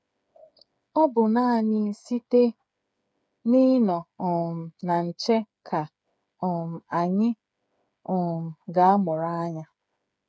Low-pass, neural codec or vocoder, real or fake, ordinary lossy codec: none; codec, 16 kHz, 8 kbps, FreqCodec, smaller model; fake; none